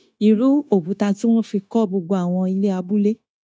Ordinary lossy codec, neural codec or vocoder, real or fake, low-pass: none; codec, 16 kHz, 0.9 kbps, LongCat-Audio-Codec; fake; none